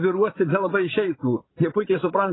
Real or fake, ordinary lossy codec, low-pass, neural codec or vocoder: fake; AAC, 16 kbps; 7.2 kHz; vocoder, 44.1 kHz, 80 mel bands, Vocos